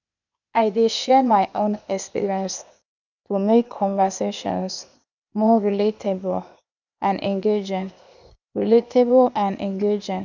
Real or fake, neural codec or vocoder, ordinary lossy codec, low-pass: fake; codec, 16 kHz, 0.8 kbps, ZipCodec; none; 7.2 kHz